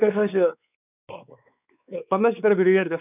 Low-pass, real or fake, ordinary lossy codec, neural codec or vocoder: 3.6 kHz; fake; none; codec, 16 kHz, 4 kbps, X-Codec, WavLM features, trained on Multilingual LibriSpeech